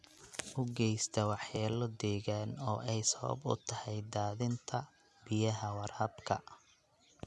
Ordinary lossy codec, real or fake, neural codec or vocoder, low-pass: none; real; none; none